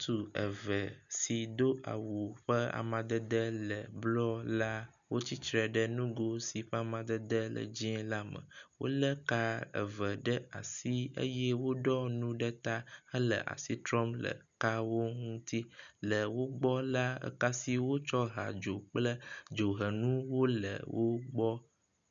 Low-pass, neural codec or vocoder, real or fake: 7.2 kHz; none; real